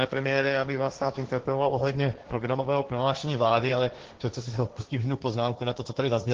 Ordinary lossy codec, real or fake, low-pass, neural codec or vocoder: Opus, 16 kbps; fake; 7.2 kHz; codec, 16 kHz, 1.1 kbps, Voila-Tokenizer